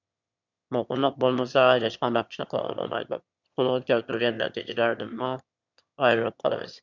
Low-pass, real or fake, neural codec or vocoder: 7.2 kHz; fake; autoencoder, 22.05 kHz, a latent of 192 numbers a frame, VITS, trained on one speaker